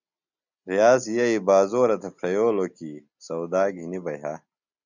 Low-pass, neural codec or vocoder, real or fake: 7.2 kHz; none; real